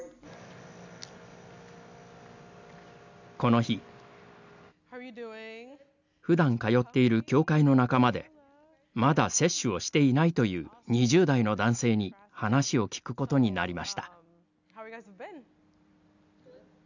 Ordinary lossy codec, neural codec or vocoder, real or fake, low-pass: none; none; real; 7.2 kHz